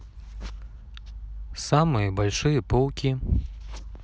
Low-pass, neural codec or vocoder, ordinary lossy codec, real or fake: none; none; none; real